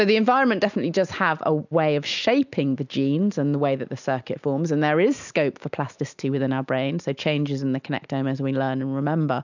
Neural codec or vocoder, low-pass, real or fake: none; 7.2 kHz; real